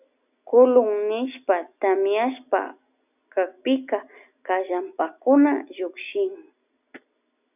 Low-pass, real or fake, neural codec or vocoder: 3.6 kHz; real; none